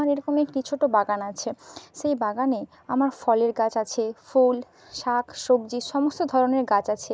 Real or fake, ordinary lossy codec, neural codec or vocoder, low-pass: real; none; none; none